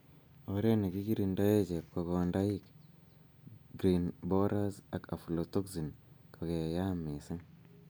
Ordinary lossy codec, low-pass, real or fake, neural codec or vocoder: none; none; real; none